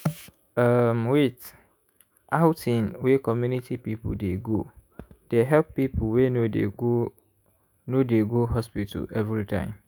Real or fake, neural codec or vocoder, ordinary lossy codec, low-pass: fake; autoencoder, 48 kHz, 128 numbers a frame, DAC-VAE, trained on Japanese speech; none; none